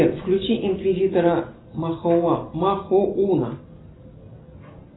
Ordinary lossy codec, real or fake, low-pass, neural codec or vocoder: AAC, 16 kbps; real; 7.2 kHz; none